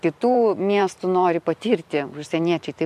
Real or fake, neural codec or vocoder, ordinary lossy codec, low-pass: fake; vocoder, 44.1 kHz, 128 mel bands every 512 samples, BigVGAN v2; MP3, 64 kbps; 14.4 kHz